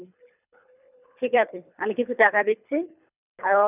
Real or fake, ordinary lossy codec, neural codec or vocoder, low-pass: fake; none; codec, 24 kHz, 3 kbps, HILCodec; 3.6 kHz